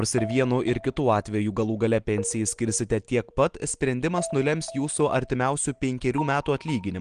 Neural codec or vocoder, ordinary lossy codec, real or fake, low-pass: none; Opus, 24 kbps; real; 9.9 kHz